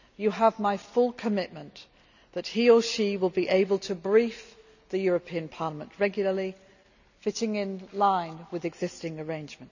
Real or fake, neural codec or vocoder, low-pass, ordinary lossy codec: real; none; 7.2 kHz; none